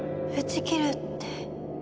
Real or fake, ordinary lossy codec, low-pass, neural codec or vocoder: real; none; none; none